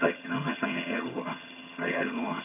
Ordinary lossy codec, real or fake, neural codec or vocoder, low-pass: none; fake; vocoder, 22.05 kHz, 80 mel bands, HiFi-GAN; 3.6 kHz